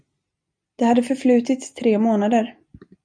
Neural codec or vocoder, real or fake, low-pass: none; real; 9.9 kHz